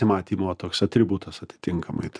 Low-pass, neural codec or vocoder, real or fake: 9.9 kHz; none; real